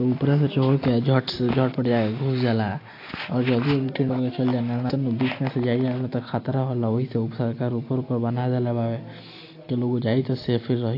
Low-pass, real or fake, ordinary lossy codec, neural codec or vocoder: 5.4 kHz; real; AAC, 32 kbps; none